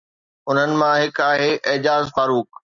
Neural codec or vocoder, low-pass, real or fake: none; 7.2 kHz; real